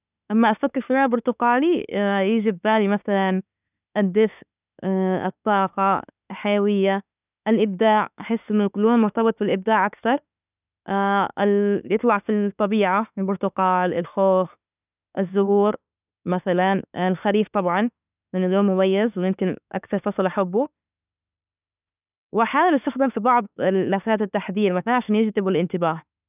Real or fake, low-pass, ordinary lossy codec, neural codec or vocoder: fake; 3.6 kHz; none; autoencoder, 48 kHz, 32 numbers a frame, DAC-VAE, trained on Japanese speech